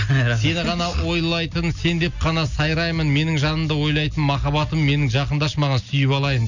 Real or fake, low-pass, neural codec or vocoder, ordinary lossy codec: real; 7.2 kHz; none; none